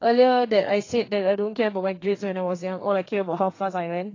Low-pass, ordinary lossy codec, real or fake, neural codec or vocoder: 7.2 kHz; AAC, 32 kbps; fake; codec, 32 kHz, 1.9 kbps, SNAC